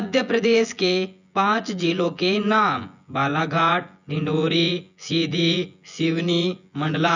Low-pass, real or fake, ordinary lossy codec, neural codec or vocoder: 7.2 kHz; fake; none; vocoder, 24 kHz, 100 mel bands, Vocos